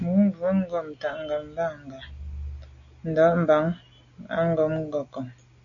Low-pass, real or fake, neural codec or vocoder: 7.2 kHz; real; none